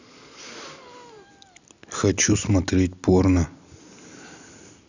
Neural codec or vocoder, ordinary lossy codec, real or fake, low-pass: none; none; real; 7.2 kHz